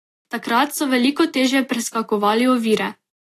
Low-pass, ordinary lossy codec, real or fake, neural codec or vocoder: 14.4 kHz; AAC, 48 kbps; real; none